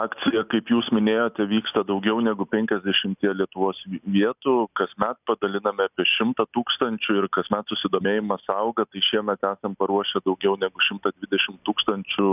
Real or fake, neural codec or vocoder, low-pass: real; none; 3.6 kHz